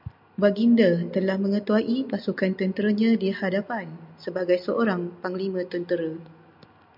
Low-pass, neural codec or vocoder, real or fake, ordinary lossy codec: 5.4 kHz; none; real; MP3, 48 kbps